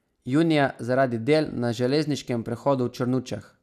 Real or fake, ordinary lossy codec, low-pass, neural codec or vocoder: real; none; 14.4 kHz; none